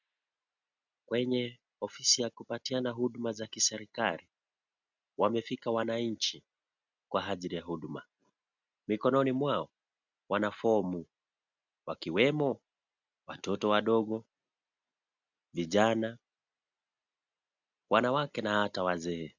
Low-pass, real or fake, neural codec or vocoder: 7.2 kHz; real; none